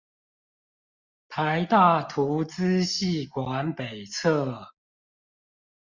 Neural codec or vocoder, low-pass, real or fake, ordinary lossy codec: none; 7.2 kHz; real; Opus, 64 kbps